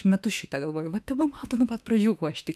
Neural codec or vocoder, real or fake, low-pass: autoencoder, 48 kHz, 32 numbers a frame, DAC-VAE, trained on Japanese speech; fake; 14.4 kHz